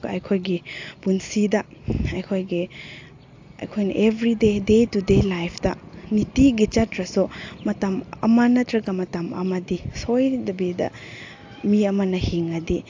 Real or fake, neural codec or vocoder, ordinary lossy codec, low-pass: real; none; AAC, 48 kbps; 7.2 kHz